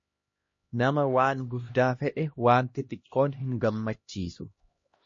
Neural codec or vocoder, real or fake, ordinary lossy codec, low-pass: codec, 16 kHz, 1 kbps, X-Codec, HuBERT features, trained on LibriSpeech; fake; MP3, 32 kbps; 7.2 kHz